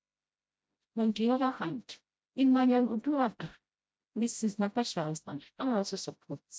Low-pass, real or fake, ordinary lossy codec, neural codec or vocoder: none; fake; none; codec, 16 kHz, 0.5 kbps, FreqCodec, smaller model